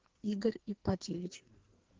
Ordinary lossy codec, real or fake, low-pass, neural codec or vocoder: Opus, 16 kbps; fake; 7.2 kHz; codec, 44.1 kHz, 2.6 kbps, SNAC